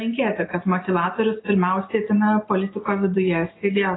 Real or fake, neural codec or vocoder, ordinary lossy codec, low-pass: real; none; AAC, 16 kbps; 7.2 kHz